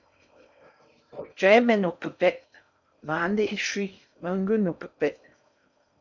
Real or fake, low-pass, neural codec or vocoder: fake; 7.2 kHz; codec, 16 kHz in and 24 kHz out, 0.6 kbps, FocalCodec, streaming, 4096 codes